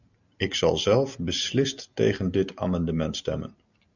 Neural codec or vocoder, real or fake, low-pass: none; real; 7.2 kHz